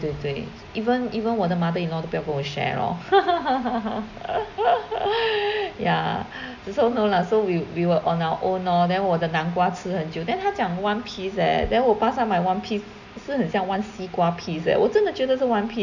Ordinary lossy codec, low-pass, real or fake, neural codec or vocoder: none; 7.2 kHz; real; none